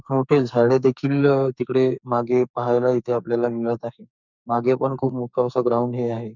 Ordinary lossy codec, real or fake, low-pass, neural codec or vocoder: none; fake; 7.2 kHz; codec, 44.1 kHz, 2.6 kbps, SNAC